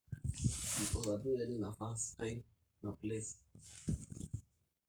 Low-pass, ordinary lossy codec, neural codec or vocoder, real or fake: none; none; codec, 44.1 kHz, 7.8 kbps, Pupu-Codec; fake